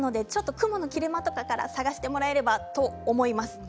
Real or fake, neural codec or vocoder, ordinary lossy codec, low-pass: real; none; none; none